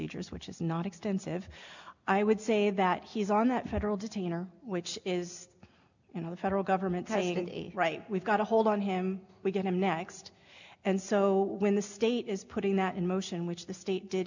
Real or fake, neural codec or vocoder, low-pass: real; none; 7.2 kHz